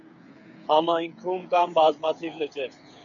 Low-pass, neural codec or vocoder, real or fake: 7.2 kHz; codec, 16 kHz, 8 kbps, FreqCodec, smaller model; fake